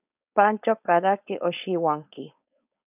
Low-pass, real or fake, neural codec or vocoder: 3.6 kHz; fake; codec, 16 kHz, 4.8 kbps, FACodec